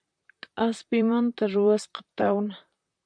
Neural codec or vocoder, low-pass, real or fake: vocoder, 44.1 kHz, 128 mel bands, Pupu-Vocoder; 9.9 kHz; fake